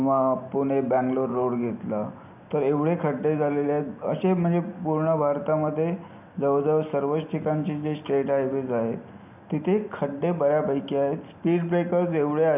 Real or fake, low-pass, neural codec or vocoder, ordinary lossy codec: real; 3.6 kHz; none; none